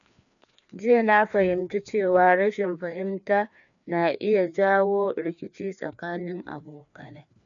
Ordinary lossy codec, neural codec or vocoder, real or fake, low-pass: none; codec, 16 kHz, 2 kbps, FreqCodec, larger model; fake; 7.2 kHz